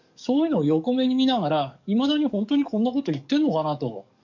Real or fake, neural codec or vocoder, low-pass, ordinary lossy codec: fake; codec, 44.1 kHz, 7.8 kbps, DAC; 7.2 kHz; none